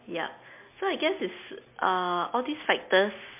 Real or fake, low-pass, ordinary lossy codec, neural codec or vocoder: real; 3.6 kHz; MP3, 32 kbps; none